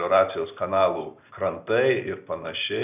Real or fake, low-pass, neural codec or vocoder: real; 3.6 kHz; none